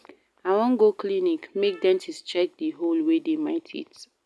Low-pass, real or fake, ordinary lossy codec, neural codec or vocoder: none; real; none; none